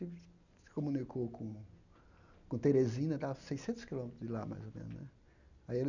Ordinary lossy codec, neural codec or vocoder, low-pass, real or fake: none; none; 7.2 kHz; real